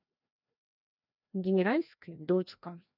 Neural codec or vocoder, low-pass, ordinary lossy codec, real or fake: codec, 16 kHz, 1 kbps, FreqCodec, larger model; 5.4 kHz; none; fake